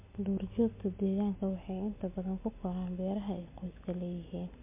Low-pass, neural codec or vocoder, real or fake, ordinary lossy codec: 3.6 kHz; none; real; MP3, 24 kbps